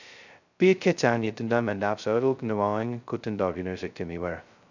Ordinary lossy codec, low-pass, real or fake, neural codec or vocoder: none; 7.2 kHz; fake; codec, 16 kHz, 0.2 kbps, FocalCodec